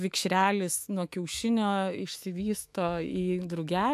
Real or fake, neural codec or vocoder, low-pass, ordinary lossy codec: fake; autoencoder, 48 kHz, 128 numbers a frame, DAC-VAE, trained on Japanese speech; 14.4 kHz; AAC, 96 kbps